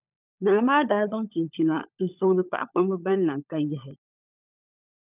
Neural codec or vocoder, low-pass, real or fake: codec, 16 kHz, 16 kbps, FunCodec, trained on LibriTTS, 50 frames a second; 3.6 kHz; fake